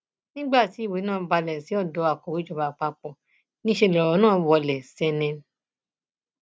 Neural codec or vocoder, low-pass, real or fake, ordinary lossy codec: none; none; real; none